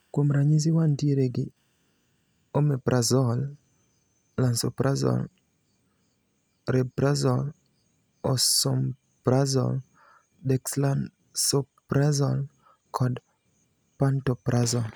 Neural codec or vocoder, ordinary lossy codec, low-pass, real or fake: none; none; none; real